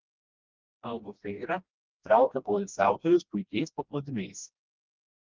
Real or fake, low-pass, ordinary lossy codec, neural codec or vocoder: fake; 7.2 kHz; Opus, 24 kbps; codec, 16 kHz, 1 kbps, FreqCodec, smaller model